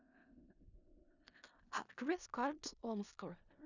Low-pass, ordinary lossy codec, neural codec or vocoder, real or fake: 7.2 kHz; none; codec, 16 kHz in and 24 kHz out, 0.4 kbps, LongCat-Audio-Codec, four codebook decoder; fake